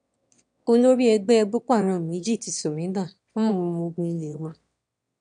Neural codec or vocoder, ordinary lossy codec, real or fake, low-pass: autoencoder, 22.05 kHz, a latent of 192 numbers a frame, VITS, trained on one speaker; none; fake; 9.9 kHz